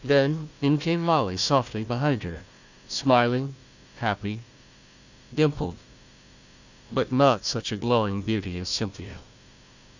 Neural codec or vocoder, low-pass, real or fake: codec, 16 kHz, 1 kbps, FunCodec, trained on Chinese and English, 50 frames a second; 7.2 kHz; fake